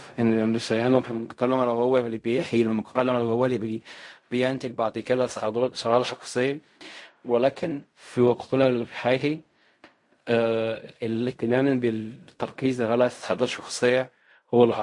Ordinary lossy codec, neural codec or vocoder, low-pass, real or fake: MP3, 48 kbps; codec, 16 kHz in and 24 kHz out, 0.4 kbps, LongCat-Audio-Codec, fine tuned four codebook decoder; 10.8 kHz; fake